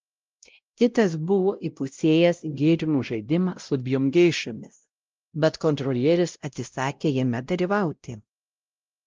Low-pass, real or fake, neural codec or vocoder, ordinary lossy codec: 7.2 kHz; fake; codec, 16 kHz, 0.5 kbps, X-Codec, WavLM features, trained on Multilingual LibriSpeech; Opus, 24 kbps